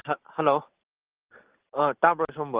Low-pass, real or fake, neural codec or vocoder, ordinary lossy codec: 3.6 kHz; real; none; Opus, 16 kbps